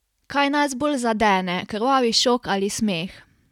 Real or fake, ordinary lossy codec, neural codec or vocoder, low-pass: real; none; none; 19.8 kHz